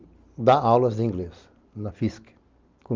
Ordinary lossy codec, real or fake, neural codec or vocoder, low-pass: Opus, 32 kbps; real; none; 7.2 kHz